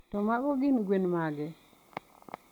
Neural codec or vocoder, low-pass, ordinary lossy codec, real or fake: none; 19.8 kHz; none; real